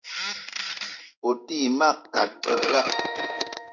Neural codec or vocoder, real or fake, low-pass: codec, 16 kHz in and 24 kHz out, 1 kbps, XY-Tokenizer; fake; 7.2 kHz